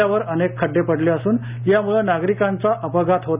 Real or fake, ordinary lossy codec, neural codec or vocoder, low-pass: real; Opus, 64 kbps; none; 3.6 kHz